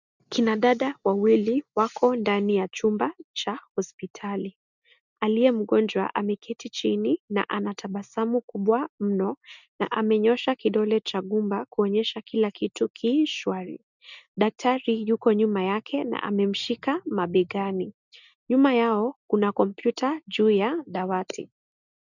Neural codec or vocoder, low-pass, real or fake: none; 7.2 kHz; real